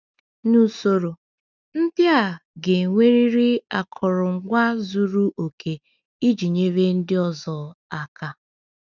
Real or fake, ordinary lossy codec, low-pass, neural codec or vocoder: real; none; 7.2 kHz; none